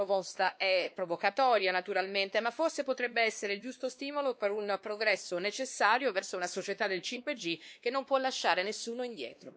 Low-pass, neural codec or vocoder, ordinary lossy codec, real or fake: none; codec, 16 kHz, 2 kbps, X-Codec, WavLM features, trained on Multilingual LibriSpeech; none; fake